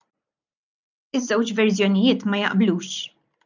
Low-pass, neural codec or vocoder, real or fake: 7.2 kHz; none; real